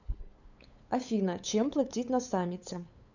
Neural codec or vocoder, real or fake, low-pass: codec, 16 kHz, 8 kbps, FunCodec, trained on LibriTTS, 25 frames a second; fake; 7.2 kHz